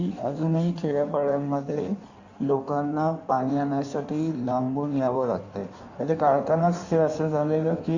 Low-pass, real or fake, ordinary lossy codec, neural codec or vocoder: 7.2 kHz; fake; Opus, 64 kbps; codec, 16 kHz in and 24 kHz out, 1.1 kbps, FireRedTTS-2 codec